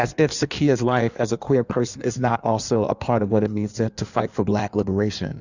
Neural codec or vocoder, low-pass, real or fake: codec, 16 kHz in and 24 kHz out, 1.1 kbps, FireRedTTS-2 codec; 7.2 kHz; fake